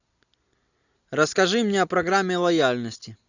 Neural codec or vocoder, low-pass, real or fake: none; 7.2 kHz; real